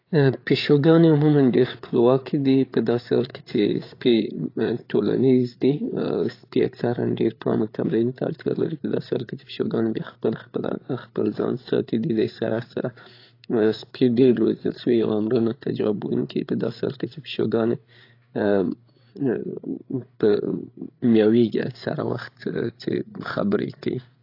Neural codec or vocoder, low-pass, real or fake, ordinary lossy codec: codec, 16 kHz, 8 kbps, FreqCodec, larger model; 5.4 kHz; fake; AAC, 32 kbps